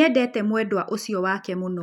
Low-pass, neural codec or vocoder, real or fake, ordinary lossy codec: 19.8 kHz; none; real; none